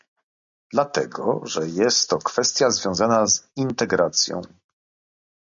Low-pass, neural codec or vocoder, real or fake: 7.2 kHz; none; real